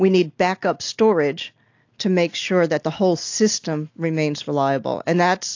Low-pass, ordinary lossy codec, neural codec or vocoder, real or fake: 7.2 kHz; AAC, 48 kbps; none; real